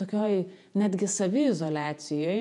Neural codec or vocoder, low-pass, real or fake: vocoder, 48 kHz, 128 mel bands, Vocos; 10.8 kHz; fake